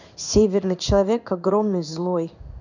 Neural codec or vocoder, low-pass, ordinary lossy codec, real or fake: codec, 16 kHz in and 24 kHz out, 1 kbps, XY-Tokenizer; 7.2 kHz; none; fake